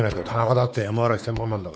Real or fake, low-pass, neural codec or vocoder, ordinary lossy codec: fake; none; codec, 16 kHz, 4 kbps, X-Codec, WavLM features, trained on Multilingual LibriSpeech; none